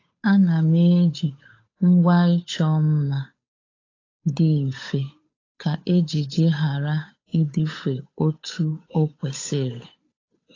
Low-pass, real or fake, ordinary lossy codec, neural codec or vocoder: 7.2 kHz; fake; AAC, 32 kbps; codec, 16 kHz, 8 kbps, FunCodec, trained on Chinese and English, 25 frames a second